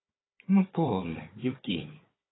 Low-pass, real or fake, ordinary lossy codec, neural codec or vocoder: 7.2 kHz; fake; AAC, 16 kbps; codec, 16 kHz, 4 kbps, FunCodec, trained on Chinese and English, 50 frames a second